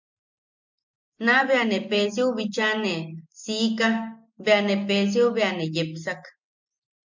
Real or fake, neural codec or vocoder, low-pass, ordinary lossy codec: real; none; 7.2 kHz; MP3, 48 kbps